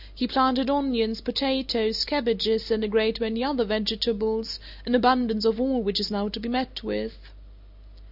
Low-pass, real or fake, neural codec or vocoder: 5.4 kHz; real; none